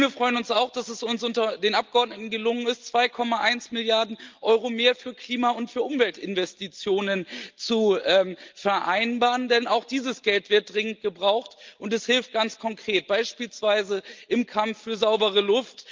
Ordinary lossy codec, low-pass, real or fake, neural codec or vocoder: Opus, 24 kbps; 7.2 kHz; real; none